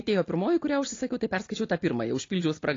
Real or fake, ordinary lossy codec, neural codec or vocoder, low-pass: real; AAC, 32 kbps; none; 7.2 kHz